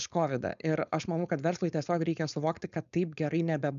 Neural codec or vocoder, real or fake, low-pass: codec, 16 kHz, 4.8 kbps, FACodec; fake; 7.2 kHz